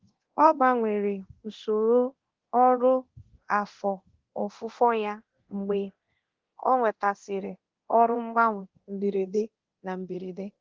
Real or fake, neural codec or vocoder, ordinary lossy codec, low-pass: fake; codec, 24 kHz, 0.9 kbps, DualCodec; Opus, 16 kbps; 7.2 kHz